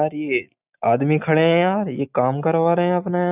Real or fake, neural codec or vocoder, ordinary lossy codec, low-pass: real; none; none; 3.6 kHz